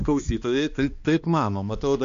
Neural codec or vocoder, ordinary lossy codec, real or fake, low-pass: codec, 16 kHz, 2 kbps, X-Codec, HuBERT features, trained on balanced general audio; MP3, 48 kbps; fake; 7.2 kHz